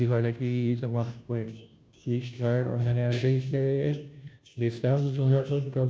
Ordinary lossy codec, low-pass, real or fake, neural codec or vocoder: none; none; fake; codec, 16 kHz, 0.5 kbps, FunCodec, trained on Chinese and English, 25 frames a second